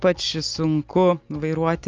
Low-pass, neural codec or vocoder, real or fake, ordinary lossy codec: 7.2 kHz; none; real; Opus, 24 kbps